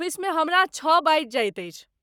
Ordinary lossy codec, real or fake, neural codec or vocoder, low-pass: none; fake; codec, 44.1 kHz, 7.8 kbps, Pupu-Codec; 19.8 kHz